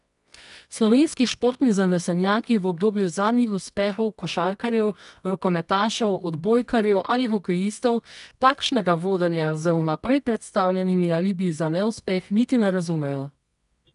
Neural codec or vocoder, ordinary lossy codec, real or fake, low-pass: codec, 24 kHz, 0.9 kbps, WavTokenizer, medium music audio release; none; fake; 10.8 kHz